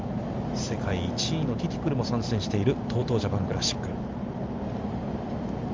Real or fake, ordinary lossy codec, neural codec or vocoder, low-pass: real; Opus, 32 kbps; none; 7.2 kHz